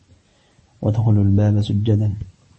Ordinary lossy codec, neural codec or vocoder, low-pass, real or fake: MP3, 32 kbps; none; 10.8 kHz; real